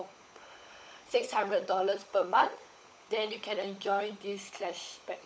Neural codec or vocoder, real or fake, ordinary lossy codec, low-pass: codec, 16 kHz, 16 kbps, FunCodec, trained on LibriTTS, 50 frames a second; fake; none; none